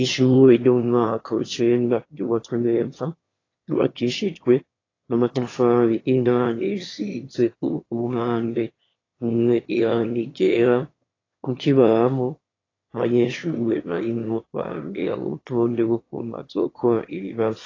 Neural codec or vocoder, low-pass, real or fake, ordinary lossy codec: autoencoder, 22.05 kHz, a latent of 192 numbers a frame, VITS, trained on one speaker; 7.2 kHz; fake; AAC, 32 kbps